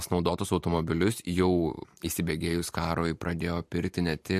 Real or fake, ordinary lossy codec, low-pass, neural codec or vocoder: fake; MP3, 64 kbps; 14.4 kHz; vocoder, 44.1 kHz, 128 mel bands every 512 samples, BigVGAN v2